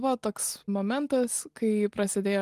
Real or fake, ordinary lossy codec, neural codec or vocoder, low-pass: real; Opus, 24 kbps; none; 14.4 kHz